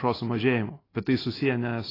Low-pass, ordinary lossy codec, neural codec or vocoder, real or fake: 5.4 kHz; AAC, 24 kbps; none; real